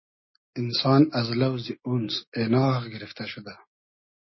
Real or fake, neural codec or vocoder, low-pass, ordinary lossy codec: real; none; 7.2 kHz; MP3, 24 kbps